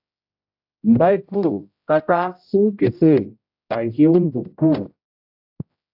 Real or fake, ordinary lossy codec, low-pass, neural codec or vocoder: fake; AAC, 48 kbps; 5.4 kHz; codec, 16 kHz, 0.5 kbps, X-Codec, HuBERT features, trained on general audio